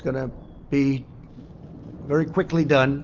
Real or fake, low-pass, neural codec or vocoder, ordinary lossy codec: real; 7.2 kHz; none; Opus, 32 kbps